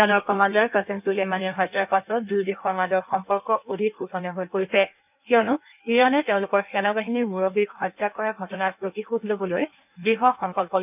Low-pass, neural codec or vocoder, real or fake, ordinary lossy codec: 3.6 kHz; codec, 16 kHz in and 24 kHz out, 1.1 kbps, FireRedTTS-2 codec; fake; none